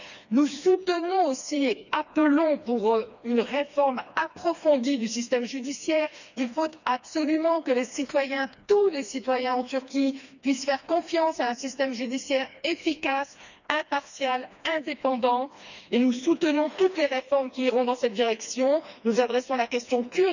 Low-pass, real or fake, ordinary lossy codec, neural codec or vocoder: 7.2 kHz; fake; none; codec, 16 kHz, 2 kbps, FreqCodec, smaller model